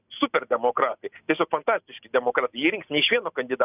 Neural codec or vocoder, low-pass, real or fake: none; 3.6 kHz; real